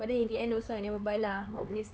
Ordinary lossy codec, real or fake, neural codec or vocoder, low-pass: none; fake; codec, 16 kHz, 4 kbps, X-Codec, HuBERT features, trained on LibriSpeech; none